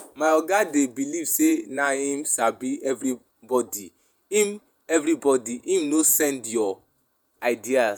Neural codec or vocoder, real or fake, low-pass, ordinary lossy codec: vocoder, 48 kHz, 128 mel bands, Vocos; fake; none; none